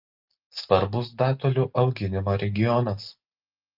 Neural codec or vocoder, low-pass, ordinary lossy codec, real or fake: none; 5.4 kHz; Opus, 32 kbps; real